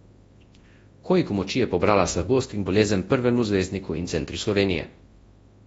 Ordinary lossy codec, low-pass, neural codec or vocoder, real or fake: AAC, 24 kbps; 10.8 kHz; codec, 24 kHz, 0.9 kbps, WavTokenizer, large speech release; fake